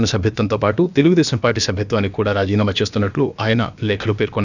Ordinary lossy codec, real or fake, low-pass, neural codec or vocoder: none; fake; 7.2 kHz; codec, 16 kHz, about 1 kbps, DyCAST, with the encoder's durations